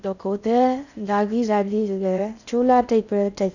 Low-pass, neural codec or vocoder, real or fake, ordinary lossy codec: 7.2 kHz; codec, 16 kHz in and 24 kHz out, 0.6 kbps, FocalCodec, streaming, 4096 codes; fake; none